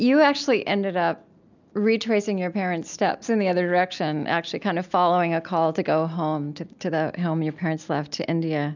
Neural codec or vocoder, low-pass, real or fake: none; 7.2 kHz; real